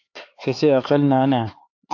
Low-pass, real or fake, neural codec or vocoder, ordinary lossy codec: 7.2 kHz; fake; codec, 16 kHz, 4 kbps, X-Codec, HuBERT features, trained on LibriSpeech; AAC, 48 kbps